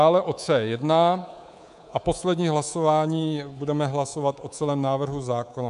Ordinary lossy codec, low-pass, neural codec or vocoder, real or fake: AAC, 96 kbps; 10.8 kHz; codec, 24 kHz, 3.1 kbps, DualCodec; fake